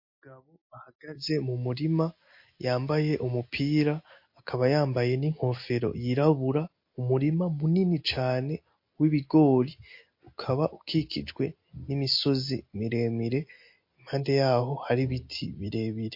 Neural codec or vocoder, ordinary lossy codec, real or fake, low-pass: none; MP3, 32 kbps; real; 5.4 kHz